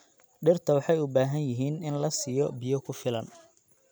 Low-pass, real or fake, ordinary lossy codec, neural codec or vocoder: none; real; none; none